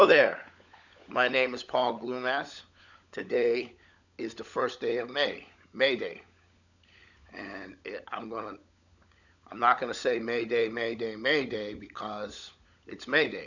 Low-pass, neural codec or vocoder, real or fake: 7.2 kHz; codec, 16 kHz, 16 kbps, FunCodec, trained on LibriTTS, 50 frames a second; fake